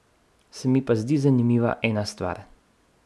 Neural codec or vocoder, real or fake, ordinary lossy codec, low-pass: none; real; none; none